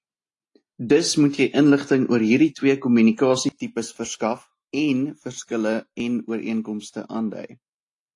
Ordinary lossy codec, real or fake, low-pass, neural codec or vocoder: AAC, 48 kbps; real; 10.8 kHz; none